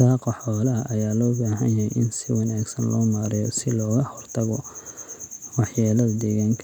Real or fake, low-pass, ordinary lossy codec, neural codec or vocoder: real; 19.8 kHz; none; none